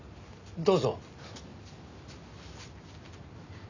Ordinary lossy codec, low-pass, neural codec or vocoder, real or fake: none; 7.2 kHz; none; real